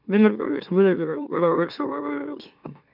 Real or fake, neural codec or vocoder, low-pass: fake; autoencoder, 44.1 kHz, a latent of 192 numbers a frame, MeloTTS; 5.4 kHz